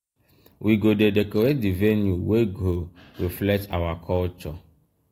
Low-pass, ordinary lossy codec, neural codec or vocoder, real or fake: 19.8 kHz; AAC, 48 kbps; none; real